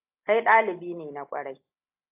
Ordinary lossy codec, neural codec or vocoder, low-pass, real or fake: AAC, 32 kbps; none; 3.6 kHz; real